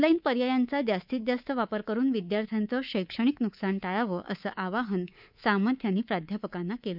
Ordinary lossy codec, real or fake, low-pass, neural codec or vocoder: none; fake; 5.4 kHz; codec, 24 kHz, 3.1 kbps, DualCodec